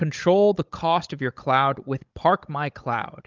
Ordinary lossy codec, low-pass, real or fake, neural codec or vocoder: Opus, 24 kbps; 7.2 kHz; fake; codec, 16 kHz, 16 kbps, FunCodec, trained on Chinese and English, 50 frames a second